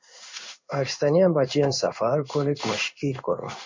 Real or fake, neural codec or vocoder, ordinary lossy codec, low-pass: fake; codec, 16 kHz in and 24 kHz out, 1 kbps, XY-Tokenizer; MP3, 64 kbps; 7.2 kHz